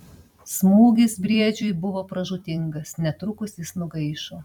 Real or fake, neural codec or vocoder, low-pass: fake; vocoder, 44.1 kHz, 128 mel bands every 256 samples, BigVGAN v2; 19.8 kHz